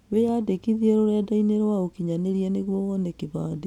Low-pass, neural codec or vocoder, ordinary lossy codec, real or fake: 19.8 kHz; none; none; real